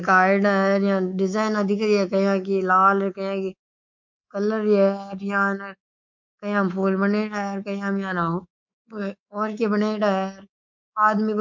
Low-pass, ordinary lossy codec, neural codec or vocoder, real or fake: 7.2 kHz; MP3, 48 kbps; codec, 24 kHz, 3.1 kbps, DualCodec; fake